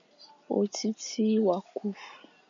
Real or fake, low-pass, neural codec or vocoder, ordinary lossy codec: real; 7.2 kHz; none; MP3, 96 kbps